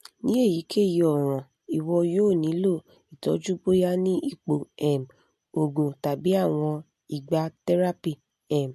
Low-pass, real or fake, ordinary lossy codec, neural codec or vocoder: 14.4 kHz; real; MP3, 64 kbps; none